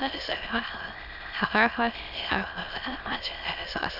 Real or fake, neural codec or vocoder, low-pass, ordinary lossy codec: fake; autoencoder, 22.05 kHz, a latent of 192 numbers a frame, VITS, trained on many speakers; 5.4 kHz; none